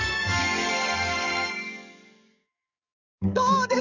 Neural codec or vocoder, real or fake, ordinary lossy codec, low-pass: codec, 44.1 kHz, 7.8 kbps, DAC; fake; none; 7.2 kHz